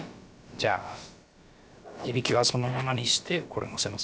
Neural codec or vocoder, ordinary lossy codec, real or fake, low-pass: codec, 16 kHz, about 1 kbps, DyCAST, with the encoder's durations; none; fake; none